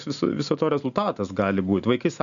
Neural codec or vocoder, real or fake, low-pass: none; real; 7.2 kHz